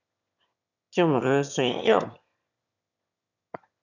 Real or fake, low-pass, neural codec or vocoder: fake; 7.2 kHz; autoencoder, 22.05 kHz, a latent of 192 numbers a frame, VITS, trained on one speaker